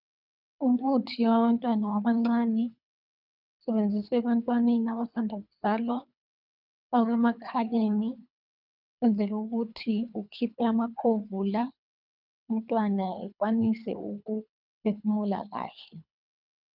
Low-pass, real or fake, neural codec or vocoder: 5.4 kHz; fake; codec, 24 kHz, 3 kbps, HILCodec